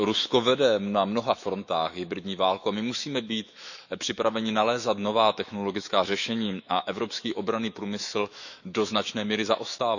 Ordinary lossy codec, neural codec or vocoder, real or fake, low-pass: none; autoencoder, 48 kHz, 128 numbers a frame, DAC-VAE, trained on Japanese speech; fake; 7.2 kHz